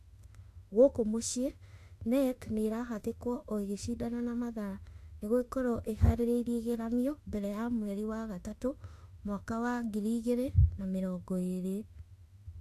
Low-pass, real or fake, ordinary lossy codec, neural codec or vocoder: 14.4 kHz; fake; AAC, 64 kbps; autoencoder, 48 kHz, 32 numbers a frame, DAC-VAE, trained on Japanese speech